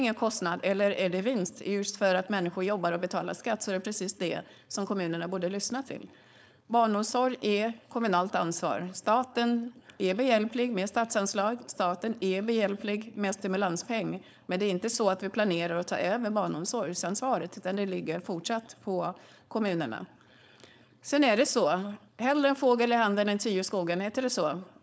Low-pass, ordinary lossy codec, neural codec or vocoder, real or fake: none; none; codec, 16 kHz, 4.8 kbps, FACodec; fake